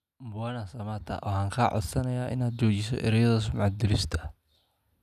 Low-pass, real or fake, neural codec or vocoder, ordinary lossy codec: 14.4 kHz; real; none; none